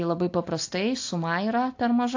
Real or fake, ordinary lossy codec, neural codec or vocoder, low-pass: real; AAC, 48 kbps; none; 7.2 kHz